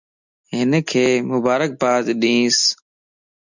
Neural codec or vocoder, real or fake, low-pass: none; real; 7.2 kHz